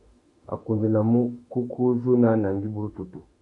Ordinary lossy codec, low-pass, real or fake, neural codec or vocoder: MP3, 48 kbps; 10.8 kHz; fake; autoencoder, 48 kHz, 32 numbers a frame, DAC-VAE, trained on Japanese speech